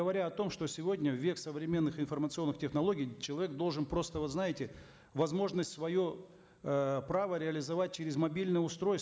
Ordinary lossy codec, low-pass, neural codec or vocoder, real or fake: none; none; none; real